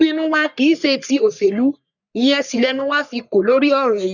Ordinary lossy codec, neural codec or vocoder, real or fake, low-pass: none; codec, 44.1 kHz, 3.4 kbps, Pupu-Codec; fake; 7.2 kHz